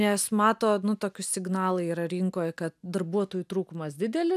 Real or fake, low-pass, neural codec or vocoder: real; 14.4 kHz; none